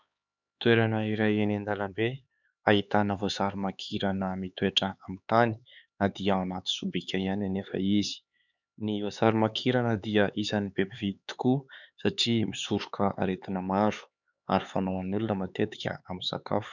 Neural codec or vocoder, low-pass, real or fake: codec, 16 kHz, 4 kbps, X-Codec, HuBERT features, trained on LibriSpeech; 7.2 kHz; fake